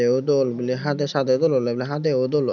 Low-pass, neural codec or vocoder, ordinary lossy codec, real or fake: 7.2 kHz; none; none; real